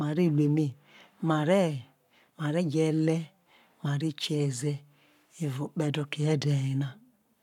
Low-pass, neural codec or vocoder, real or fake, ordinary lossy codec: 19.8 kHz; codec, 44.1 kHz, 7.8 kbps, Pupu-Codec; fake; none